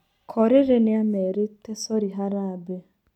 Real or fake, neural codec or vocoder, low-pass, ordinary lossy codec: real; none; 19.8 kHz; none